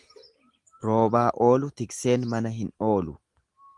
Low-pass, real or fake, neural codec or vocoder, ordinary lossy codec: 10.8 kHz; real; none; Opus, 24 kbps